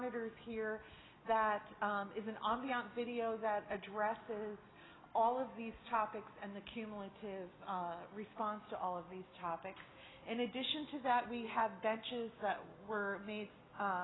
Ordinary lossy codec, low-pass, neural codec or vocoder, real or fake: AAC, 16 kbps; 7.2 kHz; none; real